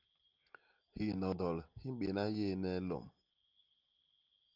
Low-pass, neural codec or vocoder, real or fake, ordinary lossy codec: 5.4 kHz; none; real; Opus, 24 kbps